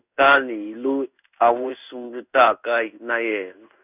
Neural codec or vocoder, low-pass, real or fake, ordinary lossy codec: codec, 16 kHz in and 24 kHz out, 1 kbps, XY-Tokenizer; 3.6 kHz; fake; none